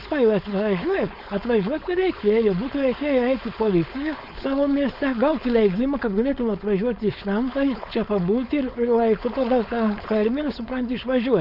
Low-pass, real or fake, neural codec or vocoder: 5.4 kHz; fake; codec, 16 kHz, 4.8 kbps, FACodec